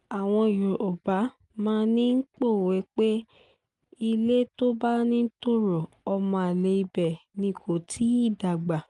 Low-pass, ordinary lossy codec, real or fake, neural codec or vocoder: 10.8 kHz; Opus, 32 kbps; real; none